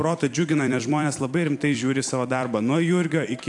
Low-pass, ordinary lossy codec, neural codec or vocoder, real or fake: 10.8 kHz; AAC, 64 kbps; vocoder, 44.1 kHz, 128 mel bands every 256 samples, BigVGAN v2; fake